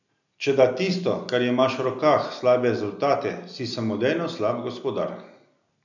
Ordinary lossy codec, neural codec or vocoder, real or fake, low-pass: none; none; real; 7.2 kHz